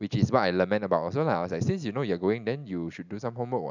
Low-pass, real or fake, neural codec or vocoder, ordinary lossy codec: 7.2 kHz; real; none; none